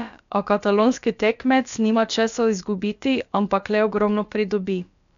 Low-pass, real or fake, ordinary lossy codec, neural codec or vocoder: 7.2 kHz; fake; none; codec, 16 kHz, about 1 kbps, DyCAST, with the encoder's durations